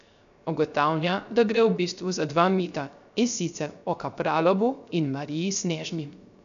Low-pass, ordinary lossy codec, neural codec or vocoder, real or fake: 7.2 kHz; none; codec, 16 kHz, 0.3 kbps, FocalCodec; fake